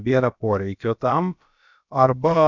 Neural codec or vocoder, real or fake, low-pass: codec, 16 kHz, about 1 kbps, DyCAST, with the encoder's durations; fake; 7.2 kHz